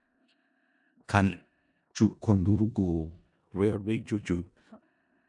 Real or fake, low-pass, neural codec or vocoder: fake; 10.8 kHz; codec, 16 kHz in and 24 kHz out, 0.4 kbps, LongCat-Audio-Codec, four codebook decoder